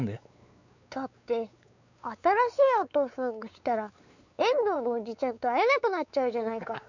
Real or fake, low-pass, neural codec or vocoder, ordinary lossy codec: fake; 7.2 kHz; codec, 16 kHz, 4 kbps, X-Codec, WavLM features, trained on Multilingual LibriSpeech; none